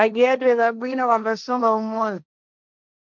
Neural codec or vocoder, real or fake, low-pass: codec, 16 kHz, 1.1 kbps, Voila-Tokenizer; fake; 7.2 kHz